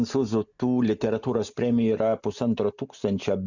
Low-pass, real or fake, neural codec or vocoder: 7.2 kHz; real; none